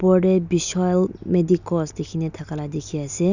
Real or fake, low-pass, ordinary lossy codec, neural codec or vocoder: real; 7.2 kHz; none; none